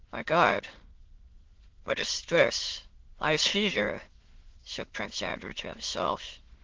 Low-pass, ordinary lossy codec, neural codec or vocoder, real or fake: 7.2 kHz; Opus, 16 kbps; autoencoder, 22.05 kHz, a latent of 192 numbers a frame, VITS, trained on many speakers; fake